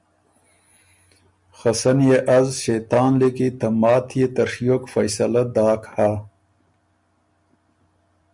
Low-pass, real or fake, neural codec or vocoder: 10.8 kHz; real; none